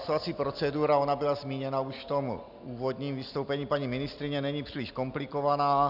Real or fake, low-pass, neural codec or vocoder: real; 5.4 kHz; none